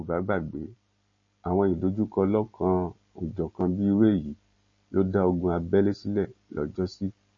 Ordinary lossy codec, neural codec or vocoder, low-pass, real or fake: MP3, 32 kbps; none; 7.2 kHz; real